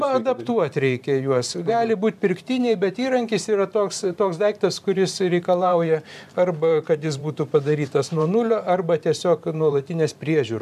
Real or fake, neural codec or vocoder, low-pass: fake; vocoder, 44.1 kHz, 128 mel bands every 512 samples, BigVGAN v2; 14.4 kHz